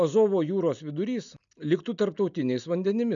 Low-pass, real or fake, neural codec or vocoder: 7.2 kHz; real; none